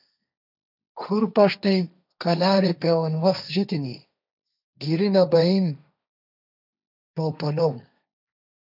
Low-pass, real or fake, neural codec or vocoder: 5.4 kHz; fake; codec, 16 kHz, 1.1 kbps, Voila-Tokenizer